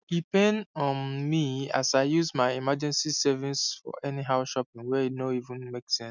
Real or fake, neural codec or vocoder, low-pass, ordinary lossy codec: real; none; 7.2 kHz; none